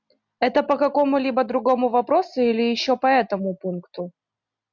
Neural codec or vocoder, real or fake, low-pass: none; real; 7.2 kHz